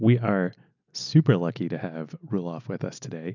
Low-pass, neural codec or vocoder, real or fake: 7.2 kHz; none; real